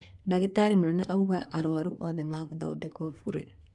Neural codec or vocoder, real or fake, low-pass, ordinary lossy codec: codec, 24 kHz, 1 kbps, SNAC; fake; 10.8 kHz; none